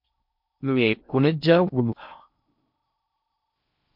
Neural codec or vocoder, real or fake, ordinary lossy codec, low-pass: codec, 16 kHz in and 24 kHz out, 0.6 kbps, FocalCodec, streaming, 4096 codes; fake; MP3, 48 kbps; 5.4 kHz